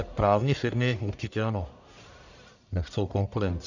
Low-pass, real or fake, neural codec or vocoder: 7.2 kHz; fake; codec, 44.1 kHz, 1.7 kbps, Pupu-Codec